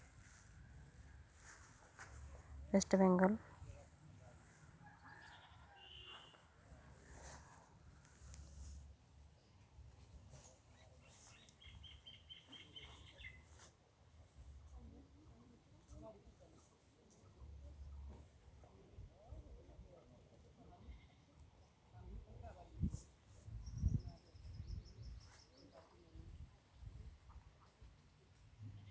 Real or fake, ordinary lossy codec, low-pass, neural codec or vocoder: real; none; none; none